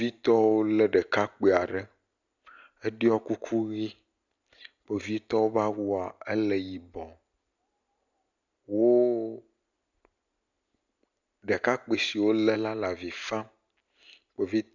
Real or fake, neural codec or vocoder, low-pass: real; none; 7.2 kHz